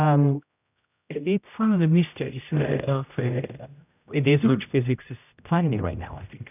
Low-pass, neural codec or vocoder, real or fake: 3.6 kHz; codec, 16 kHz, 0.5 kbps, X-Codec, HuBERT features, trained on general audio; fake